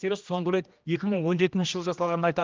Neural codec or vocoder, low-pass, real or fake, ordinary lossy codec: codec, 16 kHz, 1 kbps, X-Codec, HuBERT features, trained on general audio; 7.2 kHz; fake; Opus, 24 kbps